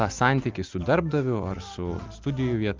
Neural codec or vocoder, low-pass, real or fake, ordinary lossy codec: none; 7.2 kHz; real; Opus, 24 kbps